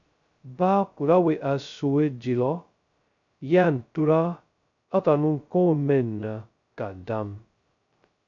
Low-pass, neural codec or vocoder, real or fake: 7.2 kHz; codec, 16 kHz, 0.2 kbps, FocalCodec; fake